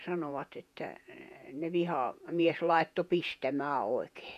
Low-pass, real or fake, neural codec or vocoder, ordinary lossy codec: 14.4 kHz; fake; vocoder, 48 kHz, 128 mel bands, Vocos; none